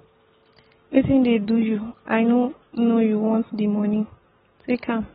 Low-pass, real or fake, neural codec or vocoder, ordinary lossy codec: 19.8 kHz; real; none; AAC, 16 kbps